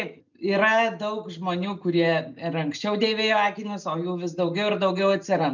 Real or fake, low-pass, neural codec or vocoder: real; 7.2 kHz; none